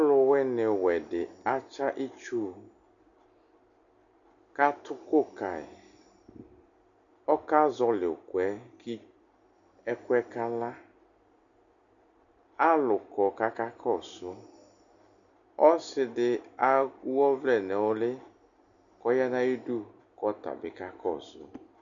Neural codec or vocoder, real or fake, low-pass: none; real; 7.2 kHz